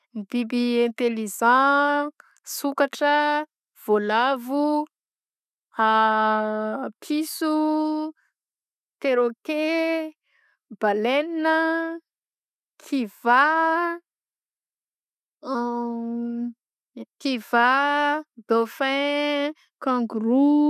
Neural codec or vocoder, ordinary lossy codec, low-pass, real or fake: none; none; 14.4 kHz; real